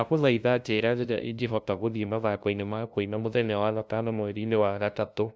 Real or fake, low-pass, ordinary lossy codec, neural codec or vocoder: fake; none; none; codec, 16 kHz, 0.5 kbps, FunCodec, trained on LibriTTS, 25 frames a second